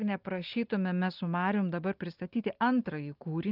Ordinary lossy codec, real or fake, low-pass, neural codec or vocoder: Opus, 32 kbps; real; 5.4 kHz; none